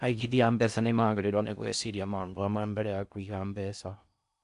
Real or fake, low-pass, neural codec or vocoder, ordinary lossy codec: fake; 10.8 kHz; codec, 16 kHz in and 24 kHz out, 0.6 kbps, FocalCodec, streaming, 4096 codes; none